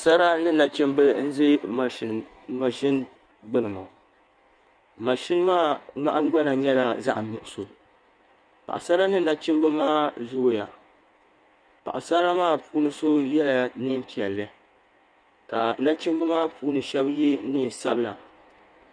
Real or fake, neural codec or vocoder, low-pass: fake; codec, 16 kHz in and 24 kHz out, 1.1 kbps, FireRedTTS-2 codec; 9.9 kHz